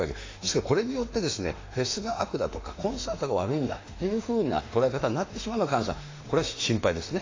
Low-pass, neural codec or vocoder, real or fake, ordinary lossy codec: 7.2 kHz; autoencoder, 48 kHz, 32 numbers a frame, DAC-VAE, trained on Japanese speech; fake; AAC, 32 kbps